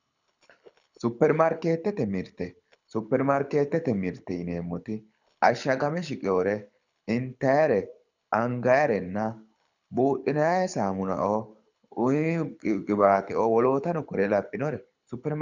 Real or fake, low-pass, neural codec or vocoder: fake; 7.2 kHz; codec, 24 kHz, 6 kbps, HILCodec